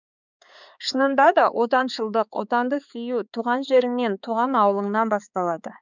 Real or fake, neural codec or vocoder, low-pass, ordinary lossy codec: fake; codec, 16 kHz, 4 kbps, X-Codec, HuBERT features, trained on balanced general audio; 7.2 kHz; none